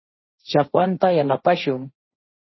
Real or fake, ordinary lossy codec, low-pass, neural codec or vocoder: fake; MP3, 24 kbps; 7.2 kHz; codec, 16 kHz, 1.1 kbps, Voila-Tokenizer